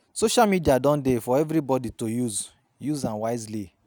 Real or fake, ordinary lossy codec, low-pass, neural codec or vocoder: real; none; none; none